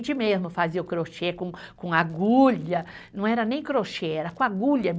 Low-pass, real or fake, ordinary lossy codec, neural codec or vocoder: none; real; none; none